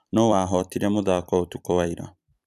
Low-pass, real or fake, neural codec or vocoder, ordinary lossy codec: 14.4 kHz; fake; vocoder, 44.1 kHz, 128 mel bands every 256 samples, BigVGAN v2; none